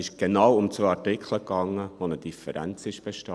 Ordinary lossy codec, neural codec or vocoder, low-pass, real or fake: none; none; none; real